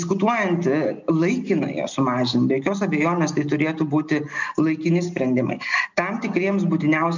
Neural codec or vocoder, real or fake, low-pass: none; real; 7.2 kHz